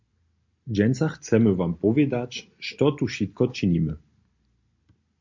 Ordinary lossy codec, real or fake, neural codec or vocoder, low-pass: MP3, 48 kbps; real; none; 7.2 kHz